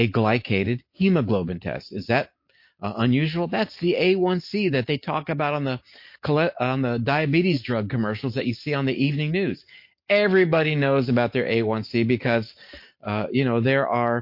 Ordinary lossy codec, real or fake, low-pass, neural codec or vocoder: MP3, 32 kbps; real; 5.4 kHz; none